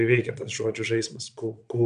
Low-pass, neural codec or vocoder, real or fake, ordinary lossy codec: 9.9 kHz; vocoder, 22.05 kHz, 80 mel bands, Vocos; fake; AAC, 96 kbps